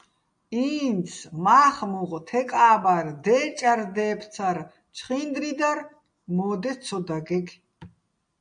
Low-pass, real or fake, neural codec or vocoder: 9.9 kHz; real; none